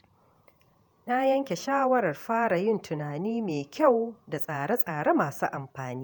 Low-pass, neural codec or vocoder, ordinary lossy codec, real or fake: none; vocoder, 48 kHz, 128 mel bands, Vocos; none; fake